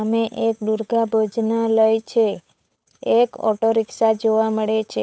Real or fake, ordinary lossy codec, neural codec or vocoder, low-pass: fake; none; codec, 16 kHz, 8 kbps, FunCodec, trained on Chinese and English, 25 frames a second; none